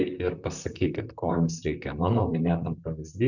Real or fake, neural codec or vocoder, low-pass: fake; vocoder, 44.1 kHz, 128 mel bands, Pupu-Vocoder; 7.2 kHz